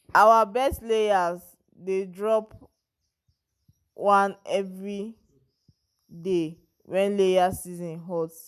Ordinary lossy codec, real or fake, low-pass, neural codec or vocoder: none; real; 14.4 kHz; none